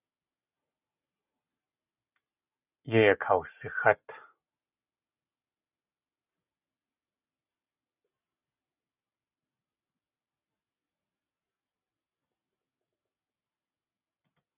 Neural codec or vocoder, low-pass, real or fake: none; 3.6 kHz; real